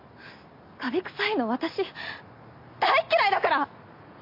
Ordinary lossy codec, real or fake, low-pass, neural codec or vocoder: MP3, 32 kbps; real; 5.4 kHz; none